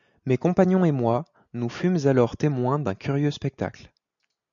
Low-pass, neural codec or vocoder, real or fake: 7.2 kHz; none; real